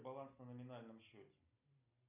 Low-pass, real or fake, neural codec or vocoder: 3.6 kHz; real; none